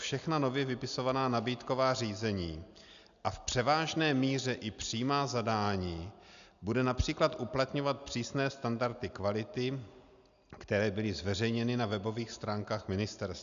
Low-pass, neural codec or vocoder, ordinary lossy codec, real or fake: 7.2 kHz; none; AAC, 96 kbps; real